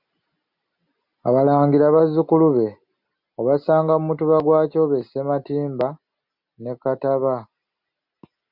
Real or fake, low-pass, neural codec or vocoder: real; 5.4 kHz; none